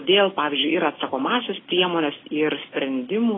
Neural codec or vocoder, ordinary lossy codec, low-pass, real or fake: none; AAC, 16 kbps; 7.2 kHz; real